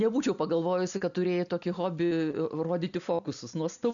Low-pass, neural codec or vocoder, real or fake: 7.2 kHz; none; real